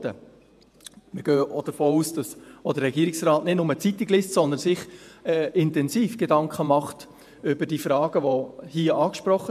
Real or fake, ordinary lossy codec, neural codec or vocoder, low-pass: fake; MP3, 96 kbps; vocoder, 48 kHz, 128 mel bands, Vocos; 14.4 kHz